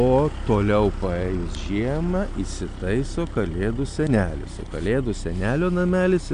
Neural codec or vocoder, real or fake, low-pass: none; real; 9.9 kHz